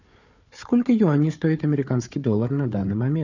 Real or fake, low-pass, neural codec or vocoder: fake; 7.2 kHz; codec, 16 kHz, 4 kbps, FunCodec, trained on Chinese and English, 50 frames a second